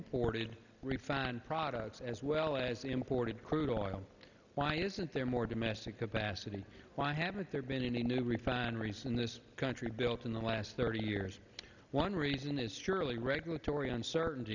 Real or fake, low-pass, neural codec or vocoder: real; 7.2 kHz; none